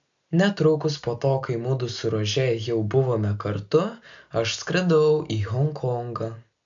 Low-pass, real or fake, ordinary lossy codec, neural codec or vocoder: 7.2 kHz; real; AAC, 64 kbps; none